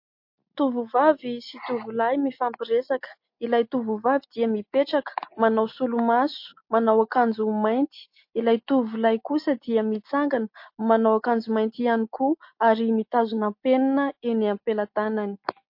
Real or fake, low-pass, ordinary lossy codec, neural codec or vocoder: real; 5.4 kHz; MP3, 32 kbps; none